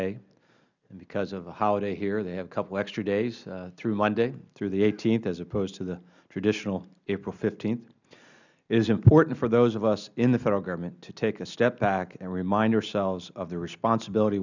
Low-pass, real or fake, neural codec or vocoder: 7.2 kHz; real; none